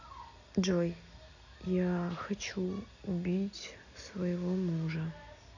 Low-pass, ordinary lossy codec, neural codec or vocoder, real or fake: 7.2 kHz; none; none; real